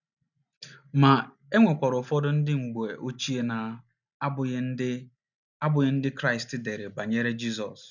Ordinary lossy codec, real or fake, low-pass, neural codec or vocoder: none; real; 7.2 kHz; none